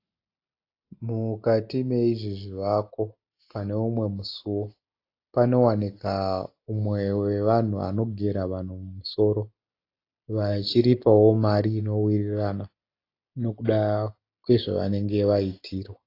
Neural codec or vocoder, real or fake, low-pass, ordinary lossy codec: none; real; 5.4 kHz; AAC, 32 kbps